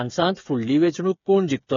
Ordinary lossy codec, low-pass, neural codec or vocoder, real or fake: AAC, 32 kbps; 7.2 kHz; codec, 16 kHz, 8 kbps, FreqCodec, smaller model; fake